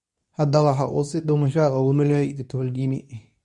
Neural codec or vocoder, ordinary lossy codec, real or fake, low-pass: codec, 24 kHz, 0.9 kbps, WavTokenizer, medium speech release version 2; none; fake; 10.8 kHz